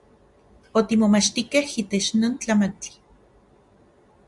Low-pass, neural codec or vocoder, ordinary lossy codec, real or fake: 10.8 kHz; none; Opus, 64 kbps; real